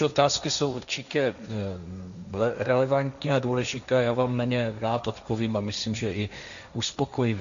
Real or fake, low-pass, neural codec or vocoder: fake; 7.2 kHz; codec, 16 kHz, 1.1 kbps, Voila-Tokenizer